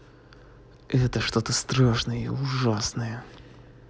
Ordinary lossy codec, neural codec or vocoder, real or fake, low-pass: none; none; real; none